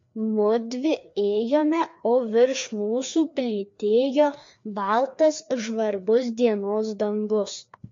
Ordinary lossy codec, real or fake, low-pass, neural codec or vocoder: MP3, 48 kbps; fake; 7.2 kHz; codec, 16 kHz, 2 kbps, FreqCodec, larger model